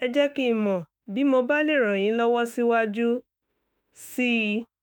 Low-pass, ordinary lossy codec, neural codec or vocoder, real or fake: none; none; autoencoder, 48 kHz, 32 numbers a frame, DAC-VAE, trained on Japanese speech; fake